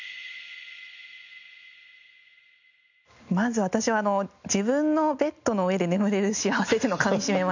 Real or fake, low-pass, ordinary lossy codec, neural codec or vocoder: real; 7.2 kHz; none; none